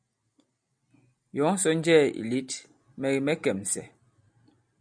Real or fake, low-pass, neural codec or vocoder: real; 9.9 kHz; none